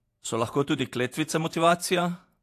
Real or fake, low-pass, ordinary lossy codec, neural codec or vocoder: fake; 14.4 kHz; AAC, 64 kbps; vocoder, 48 kHz, 128 mel bands, Vocos